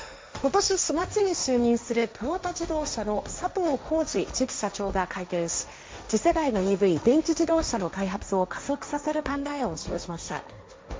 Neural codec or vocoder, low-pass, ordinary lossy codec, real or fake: codec, 16 kHz, 1.1 kbps, Voila-Tokenizer; none; none; fake